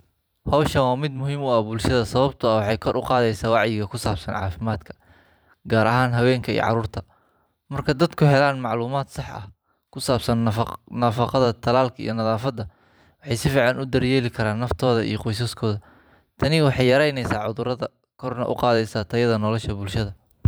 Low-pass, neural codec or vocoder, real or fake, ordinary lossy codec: none; vocoder, 44.1 kHz, 128 mel bands every 512 samples, BigVGAN v2; fake; none